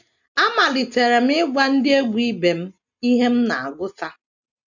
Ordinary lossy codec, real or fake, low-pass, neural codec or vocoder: none; real; 7.2 kHz; none